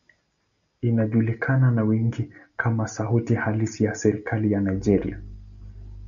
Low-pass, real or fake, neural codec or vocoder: 7.2 kHz; real; none